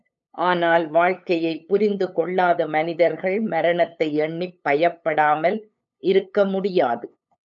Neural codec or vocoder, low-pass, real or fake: codec, 16 kHz, 8 kbps, FunCodec, trained on LibriTTS, 25 frames a second; 7.2 kHz; fake